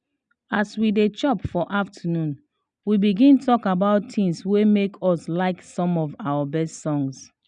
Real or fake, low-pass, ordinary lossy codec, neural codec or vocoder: real; 9.9 kHz; none; none